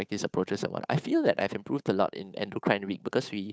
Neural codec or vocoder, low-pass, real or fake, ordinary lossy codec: codec, 16 kHz, 8 kbps, FunCodec, trained on Chinese and English, 25 frames a second; none; fake; none